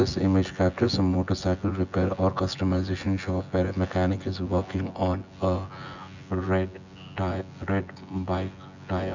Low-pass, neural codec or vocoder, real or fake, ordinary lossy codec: 7.2 kHz; vocoder, 24 kHz, 100 mel bands, Vocos; fake; none